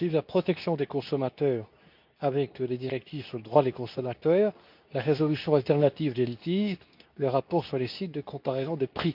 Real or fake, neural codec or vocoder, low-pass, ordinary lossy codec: fake; codec, 24 kHz, 0.9 kbps, WavTokenizer, medium speech release version 2; 5.4 kHz; none